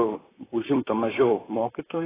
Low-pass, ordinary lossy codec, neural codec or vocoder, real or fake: 3.6 kHz; AAC, 16 kbps; vocoder, 44.1 kHz, 128 mel bands, Pupu-Vocoder; fake